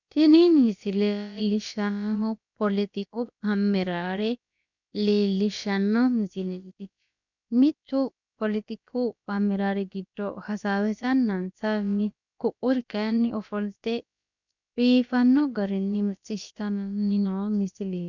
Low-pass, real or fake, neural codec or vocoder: 7.2 kHz; fake; codec, 16 kHz, about 1 kbps, DyCAST, with the encoder's durations